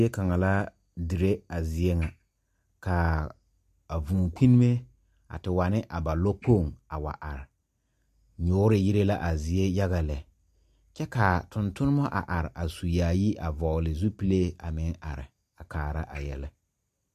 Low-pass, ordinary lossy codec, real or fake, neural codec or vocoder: 14.4 kHz; MP3, 64 kbps; real; none